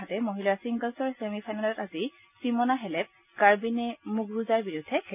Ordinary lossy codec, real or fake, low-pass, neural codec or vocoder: none; real; 3.6 kHz; none